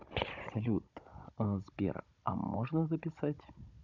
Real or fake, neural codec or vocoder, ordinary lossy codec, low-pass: fake; codec, 16 kHz, 16 kbps, FunCodec, trained on Chinese and English, 50 frames a second; none; 7.2 kHz